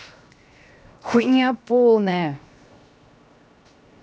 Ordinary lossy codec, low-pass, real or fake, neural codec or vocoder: none; none; fake; codec, 16 kHz, 0.7 kbps, FocalCodec